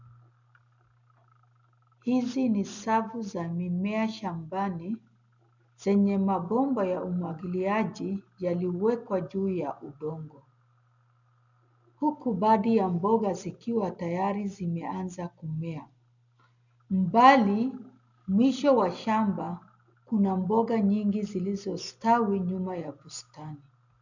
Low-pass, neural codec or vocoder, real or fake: 7.2 kHz; none; real